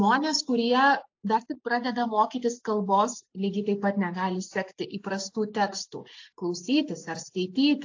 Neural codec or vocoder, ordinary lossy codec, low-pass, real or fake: none; AAC, 48 kbps; 7.2 kHz; real